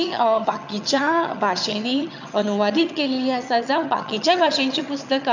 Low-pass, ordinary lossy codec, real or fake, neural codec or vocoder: 7.2 kHz; none; fake; vocoder, 22.05 kHz, 80 mel bands, HiFi-GAN